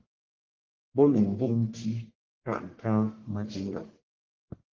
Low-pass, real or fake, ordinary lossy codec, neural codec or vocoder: 7.2 kHz; fake; Opus, 16 kbps; codec, 44.1 kHz, 1.7 kbps, Pupu-Codec